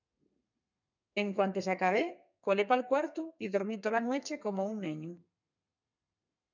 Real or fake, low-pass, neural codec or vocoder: fake; 7.2 kHz; codec, 32 kHz, 1.9 kbps, SNAC